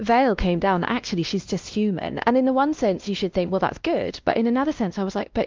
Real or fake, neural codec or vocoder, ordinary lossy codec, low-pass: fake; codec, 16 kHz, 1 kbps, X-Codec, WavLM features, trained on Multilingual LibriSpeech; Opus, 24 kbps; 7.2 kHz